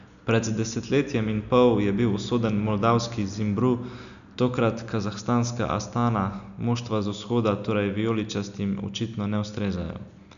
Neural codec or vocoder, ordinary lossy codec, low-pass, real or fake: none; none; 7.2 kHz; real